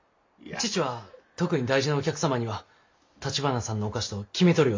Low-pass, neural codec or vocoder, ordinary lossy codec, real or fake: 7.2 kHz; none; MP3, 48 kbps; real